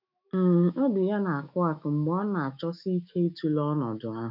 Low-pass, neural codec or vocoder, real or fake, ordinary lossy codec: 5.4 kHz; autoencoder, 48 kHz, 128 numbers a frame, DAC-VAE, trained on Japanese speech; fake; none